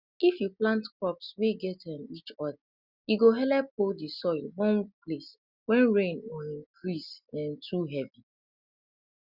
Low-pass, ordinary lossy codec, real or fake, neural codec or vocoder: 5.4 kHz; Opus, 64 kbps; real; none